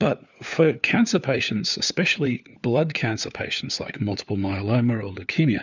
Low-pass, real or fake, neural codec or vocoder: 7.2 kHz; fake; codec, 16 kHz, 4 kbps, FunCodec, trained on LibriTTS, 50 frames a second